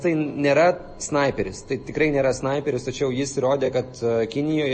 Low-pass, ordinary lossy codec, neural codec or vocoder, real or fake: 9.9 kHz; MP3, 32 kbps; none; real